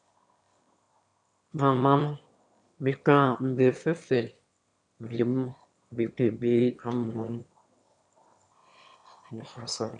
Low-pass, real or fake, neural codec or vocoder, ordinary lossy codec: 9.9 kHz; fake; autoencoder, 22.05 kHz, a latent of 192 numbers a frame, VITS, trained on one speaker; MP3, 96 kbps